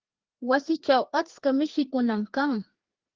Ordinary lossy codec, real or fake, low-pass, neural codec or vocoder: Opus, 16 kbps; fake; 7.2 kHz; codec, 16 kHz, 2 kbps, FreqCodec, larger model